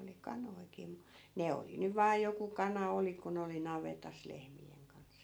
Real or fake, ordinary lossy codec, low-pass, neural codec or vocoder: real; none; none; none